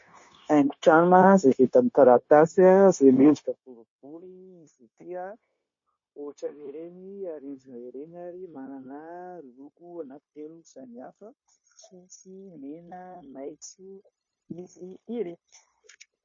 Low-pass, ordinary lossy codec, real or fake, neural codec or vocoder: 7.2 kHz; MP3, 32 kbps; fake; codec, 16 kHz, 0.9 kbps, LongCat-Audio-Codec